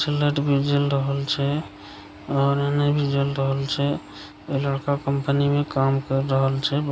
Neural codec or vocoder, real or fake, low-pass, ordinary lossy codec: none; real; none; none